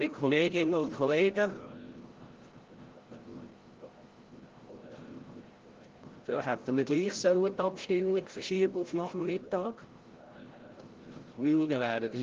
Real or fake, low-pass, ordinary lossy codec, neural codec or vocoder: fake; 7.2 kHz; Opus, 16 kbps; codec, 16 kHz, 0.5 kbps, FreqCodec, larger model